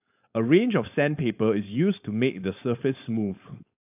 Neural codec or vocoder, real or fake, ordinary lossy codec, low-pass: codec, 16 kHz, 4.8 kbps, FACodec; fake; AAC, 32 kbps; 3.6 kHz